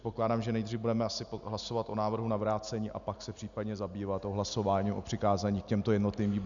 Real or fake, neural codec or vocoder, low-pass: real; none; 7.2 kHz